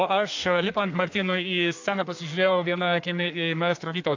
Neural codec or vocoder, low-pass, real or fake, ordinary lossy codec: codec, 32 kHz, 1.9 kbps, SNAC; 7.2 kHz; fake; AAC, 48 kbps